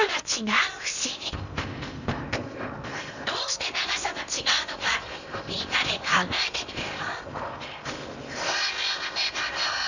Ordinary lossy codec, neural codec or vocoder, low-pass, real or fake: none; codec, 16 kHz in and 24 kHz out, 0.8 kbps, FocalCodec, streaming, 65536 codes; 7.2 kHz; fake